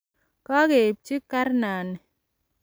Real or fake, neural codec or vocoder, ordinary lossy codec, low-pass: real; none; none; none